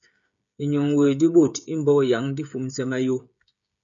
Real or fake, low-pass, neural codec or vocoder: fake; 7.2 kHz; codec, 16 kHz, 16 kbps, FreqCodec, smaller model